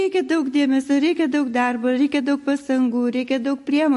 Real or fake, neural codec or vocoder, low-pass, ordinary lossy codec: real; none; 14.4 kHz; MP3, 48 kbps